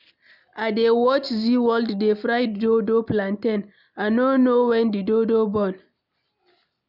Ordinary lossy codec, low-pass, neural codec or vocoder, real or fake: none; 5.4 kHz; none; real